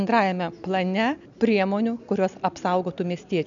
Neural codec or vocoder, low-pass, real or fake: none; 7.2 kHz; real